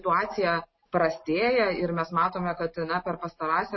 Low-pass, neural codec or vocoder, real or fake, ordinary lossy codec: 7.2 kHz; none; real; MP3, 24 kbps